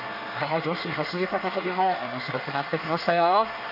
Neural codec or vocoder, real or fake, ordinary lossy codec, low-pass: codec, 24 kHz, 1 kbps, SNAC; fake; none; 5.4 kHz